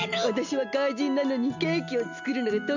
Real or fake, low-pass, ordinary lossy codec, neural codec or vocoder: real; 7.2 kHz; none; none